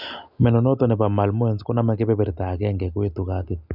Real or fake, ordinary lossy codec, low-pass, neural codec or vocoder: real; none; 5.4 kHz; none